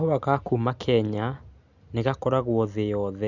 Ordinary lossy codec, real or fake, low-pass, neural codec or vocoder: none; real; 7.2 kHz; none